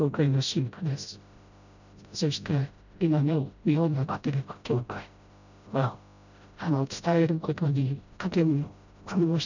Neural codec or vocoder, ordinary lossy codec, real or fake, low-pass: codec, 16 kHz, 0.5 kbps, FreqCodec, smaller model; none; fake; 7.2 kHz